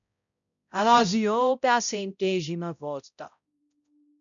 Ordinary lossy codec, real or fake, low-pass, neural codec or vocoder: MP3, 96 kbps; fake; 7.2 kHz; codec, 16 kHz, 0.5 kbps, X-Codec, HuBERT features, trained on balanced general audio